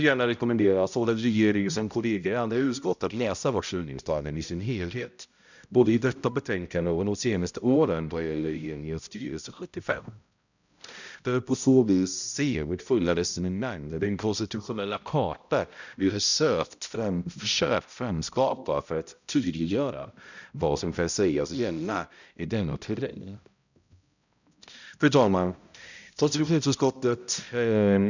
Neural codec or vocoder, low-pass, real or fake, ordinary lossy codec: codec, 16 kHz, 0.5 kbps, X-Codec, HuBERT features, trained on balanced general audio; 7.2 kHz; fake; none